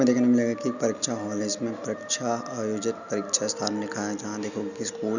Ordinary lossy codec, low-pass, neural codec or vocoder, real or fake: none; 7.2 kHz; none; real